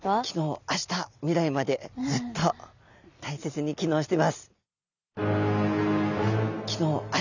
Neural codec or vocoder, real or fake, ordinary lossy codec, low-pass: none; real; none; 7.2 kHz